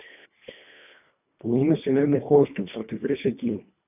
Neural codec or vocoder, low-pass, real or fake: codec, 24 kHz, 1.5 kbps, HILCodec; 3.6 kHz; fake